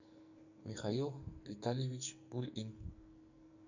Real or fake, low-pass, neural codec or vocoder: fake; 7.2 kHz; codec, 44.1 kHz, 2.6 kbps, SNAC